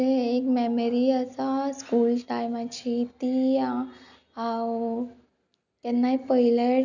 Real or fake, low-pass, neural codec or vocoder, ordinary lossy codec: real; 7.2 kHz; none; none